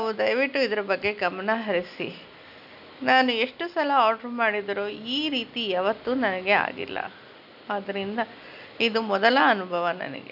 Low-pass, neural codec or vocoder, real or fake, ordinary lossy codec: 5.4 kHz; none; real; none